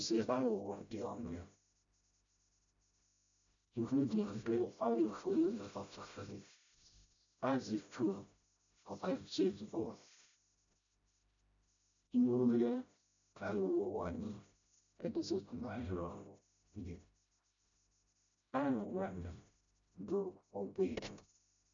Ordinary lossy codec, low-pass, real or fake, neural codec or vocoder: MP3, 64 kbps; 7.2 kHz; fake; codec, 16 kHz, 0.5 kbps, FreqCodec, smaller model